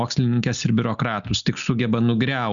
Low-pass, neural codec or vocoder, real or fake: 7.2 kHz; none; real